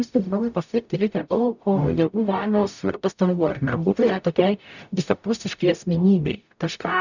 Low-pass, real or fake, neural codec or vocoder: 7.2 kHz; fake; codec, 44.1 kHz, 0.9 kbps, DAC